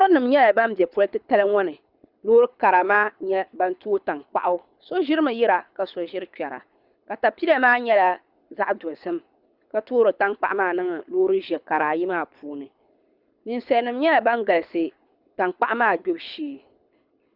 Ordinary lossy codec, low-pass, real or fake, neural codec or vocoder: Opus, 64 kbps; 5.4 kHz; fake; codec, 24 kHz, 6 kbps, HILCodec